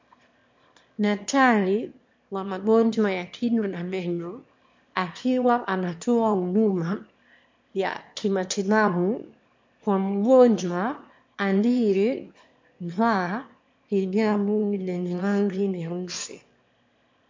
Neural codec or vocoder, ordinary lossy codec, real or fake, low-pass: autoencoder, 22.05 kHz, a latent of 192 numbers a frame, VITS, trained on one speaker; MP3, 48 kbps; fake; 7.2 kHz